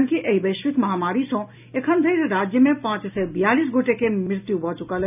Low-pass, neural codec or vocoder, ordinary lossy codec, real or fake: 3.6 kHz; none; none; real